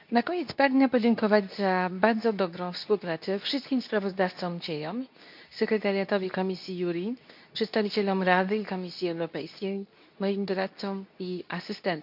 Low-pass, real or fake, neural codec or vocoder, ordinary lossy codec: 5.4 kHz; fake; codec, 24 kHz, 0.9 kbps, WavTokenizer, medium speech release version 2; none